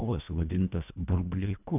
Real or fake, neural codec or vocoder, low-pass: fake; codec, 24 kHz, 1.5 kbps, HILCodec; 3.6 kHz